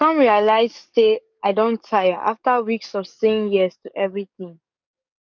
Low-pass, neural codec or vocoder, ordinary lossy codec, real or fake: 7.2 kHz; codec, 44.1 kHz, 7.8 kbps, DAC; none; fake